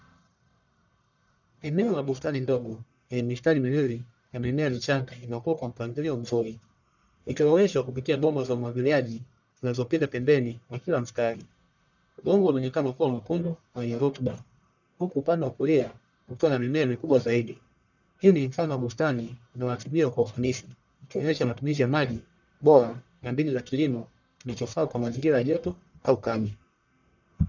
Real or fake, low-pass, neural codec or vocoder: fake; 7.2 kHz; codec, 44.1 kHz, 1.7 kbps, Pupu-Codec